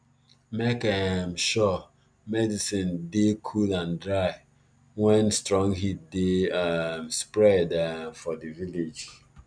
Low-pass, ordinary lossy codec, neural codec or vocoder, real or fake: 9.9 kHz; none; none; real